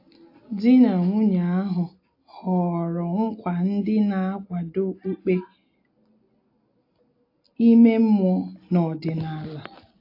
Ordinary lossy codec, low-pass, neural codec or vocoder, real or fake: AAC, 32 kbps; 5.4 kHz; none; real